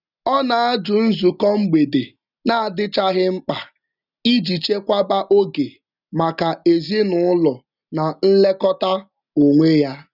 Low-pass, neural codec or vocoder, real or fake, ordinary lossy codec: 5.4 kHz; none; real; none